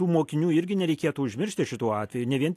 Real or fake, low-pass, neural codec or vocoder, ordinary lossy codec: real; 14.4 kHz; none; AAC, 64 kbps